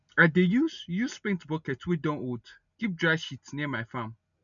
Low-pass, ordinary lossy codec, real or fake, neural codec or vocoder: 7.2 kHz; none; real; none